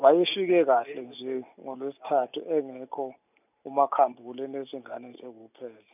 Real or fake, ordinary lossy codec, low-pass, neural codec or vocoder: real; none; 3.6 kHz; none